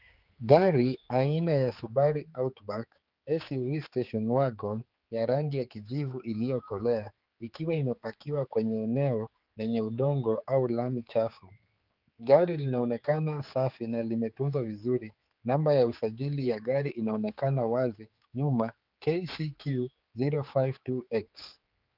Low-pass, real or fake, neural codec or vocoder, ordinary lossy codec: 5.4 kHz; fake; codec, 16 kHz, 4 kbps, X-Codec, HuBERT features, trained on general audio; Opus, 32 kbps